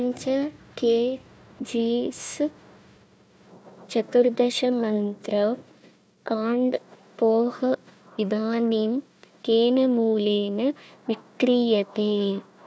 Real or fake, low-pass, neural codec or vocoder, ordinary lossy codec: fake; none; codec, 16 kHz, 1 kbps, FunCodec, trained on Chinese and English, 50 frames a second; none